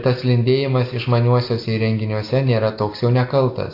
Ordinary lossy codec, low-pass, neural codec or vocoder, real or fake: AAC, 32 kbps; 5.4 kHz; none; real